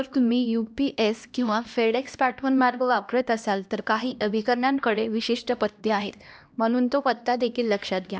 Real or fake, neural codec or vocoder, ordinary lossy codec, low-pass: fake; codec, 16 kHz, 1 kbps, X-Codec, HuBERT features, trained on LibriSpeech; none; none